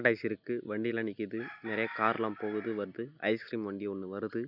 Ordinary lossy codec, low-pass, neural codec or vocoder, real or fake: none; 5.4 kHz; none; real